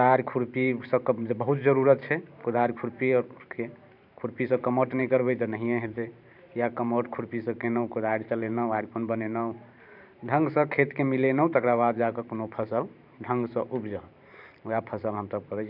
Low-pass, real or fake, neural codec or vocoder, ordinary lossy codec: 5.4 kHz; fake; vocoder, 44.1 kHz, 128 mel bands every 512 samples, BigVGAN v2; none